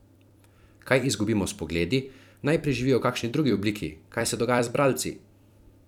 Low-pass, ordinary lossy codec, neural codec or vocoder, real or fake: 19.8 kHz; none; vocoder, 44.1 kHz, 128 mel bands every 256 samples, BigVGAN v2; fake